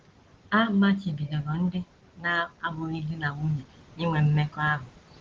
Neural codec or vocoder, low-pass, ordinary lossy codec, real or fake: none; 7.2 kHz; Opus, 16 kbps; real